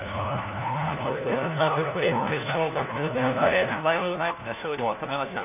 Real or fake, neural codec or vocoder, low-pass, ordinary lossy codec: fake; codec, 16 kHz, 1 kbps, FunCodec, trained on LibriTTS, 50 frames a second; 3.6 kHz; none